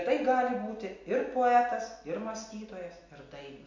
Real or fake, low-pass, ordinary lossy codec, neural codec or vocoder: real; 7.2 kHz; MP3, 48 kbps; none